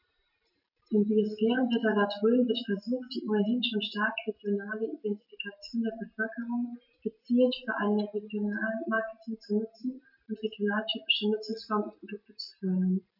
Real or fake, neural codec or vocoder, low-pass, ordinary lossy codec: real; none; 5.4 kHz; none